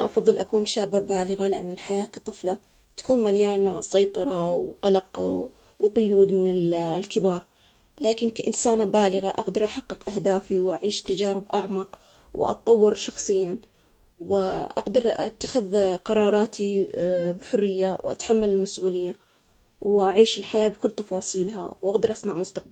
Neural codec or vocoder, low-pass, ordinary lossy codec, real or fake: codec, 44.1 kHz, 2.6 kbps, DAC; 19.8 kHz; none; fake